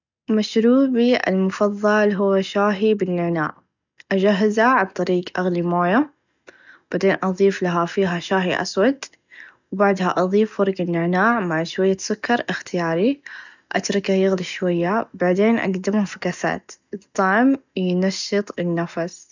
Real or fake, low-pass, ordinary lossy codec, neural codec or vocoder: real; 7.2 kHz; none; none